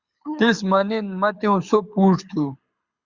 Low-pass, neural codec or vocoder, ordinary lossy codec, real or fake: 7.2 kHz; codec, 24 kHz, 6 kbps, HILCodec; Opus, 64 kbps; fake